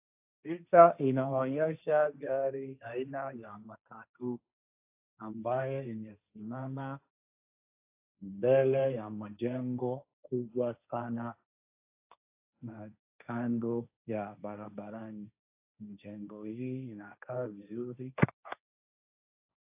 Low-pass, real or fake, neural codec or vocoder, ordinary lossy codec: 3.6 kHz; fake; codec, 16 kHz, 1.1 kbps, Voila-Tokenizer; AAC, 32 kbps